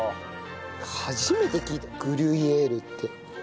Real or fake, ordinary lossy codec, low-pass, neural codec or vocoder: real; none; none; none